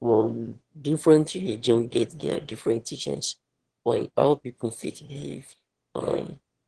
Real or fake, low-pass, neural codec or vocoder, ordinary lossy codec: fake; 9.9 kHz; autoencoder, 22.05 kHz, a latent of 192 numbers a frame, VITS, trained on one speaker; Opus, 16 kbps